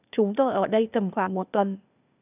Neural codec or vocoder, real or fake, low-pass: codec, 16 kHz, 0.8 kbps, ZipCodec; fake; 3.6 kHz